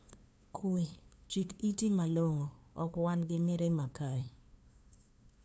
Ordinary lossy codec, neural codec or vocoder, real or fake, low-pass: none; codec, 16 kHz, 2 kbps, FunCodec, trained on LibriTTS, 25 frames a second; fake; none